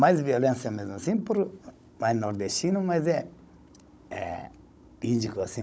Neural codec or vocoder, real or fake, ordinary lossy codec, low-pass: codec, 16 kHz, 16 kbps, FunCodec, trained on Chinese and English, 50 frames a second; fake; none; none